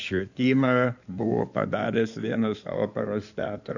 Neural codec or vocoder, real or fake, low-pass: codec, 16 kHz in and 24 kHz out, 2.2 kbps, FireRedTTS-2 codec; fake; 7.2 kHz